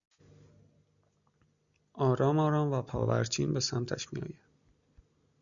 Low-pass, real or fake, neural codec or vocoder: 7.2 kHz; real; none